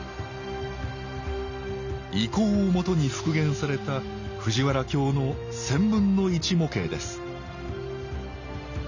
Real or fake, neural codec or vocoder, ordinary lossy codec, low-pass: real; none; none; 7.2 kHz